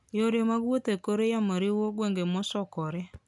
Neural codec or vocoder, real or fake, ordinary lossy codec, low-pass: none; real; none; 10.8 kHz